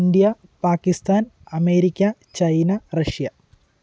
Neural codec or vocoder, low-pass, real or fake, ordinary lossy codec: none; none; real; none